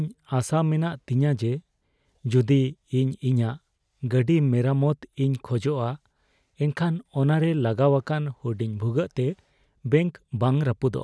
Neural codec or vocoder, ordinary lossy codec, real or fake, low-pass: none; none; real; none